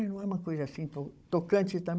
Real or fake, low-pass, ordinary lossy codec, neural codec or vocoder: fake; none; none; codec, 16 kHz, 16 kbps, FunCodec, trained on Chinese and English, 50 frames a second